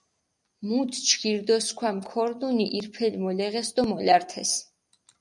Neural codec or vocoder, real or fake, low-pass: none; real; 10.8 kHz